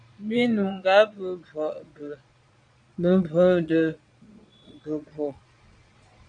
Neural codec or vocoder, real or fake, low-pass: vocoder, 22.05 kHz, 80 mel bands, Vocos; fake; 9.9 kHz